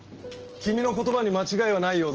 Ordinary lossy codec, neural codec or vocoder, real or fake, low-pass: Opus, 16 kbps; none; real; 7.2 kHz